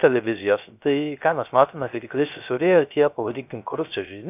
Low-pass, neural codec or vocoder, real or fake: 3.6 kHz; codec, 16 kHz, 0.3 kbps, FocalCodec; fake